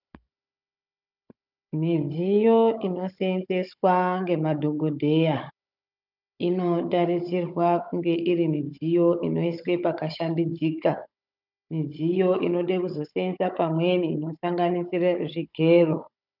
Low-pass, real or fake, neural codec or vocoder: 5.4 kHz; fake; codec, 16 kHz, 16 kbps, FunCodec, trained on Chinese and English, 50 frames a second